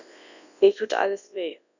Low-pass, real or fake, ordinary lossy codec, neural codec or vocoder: 7.2 kHz; fake; none; codec, 24 kHz, 0.9 kbps, WavTokenizer, large speech release